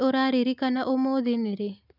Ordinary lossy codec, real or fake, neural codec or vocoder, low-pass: none; real; none; 5.4 kHz